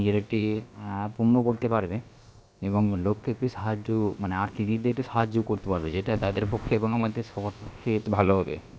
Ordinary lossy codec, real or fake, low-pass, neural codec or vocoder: none; fake; none; codec, 16 kHz, about 1 kbps, DyCAST, with the encoder's durations